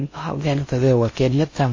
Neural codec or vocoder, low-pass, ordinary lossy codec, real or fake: codec, 16 kHz in and 24 kHz out, 0.6 kbps, FocalCodec, streaming, 4096 codes; 7.2 kHz; MP3, 32 kbps; fake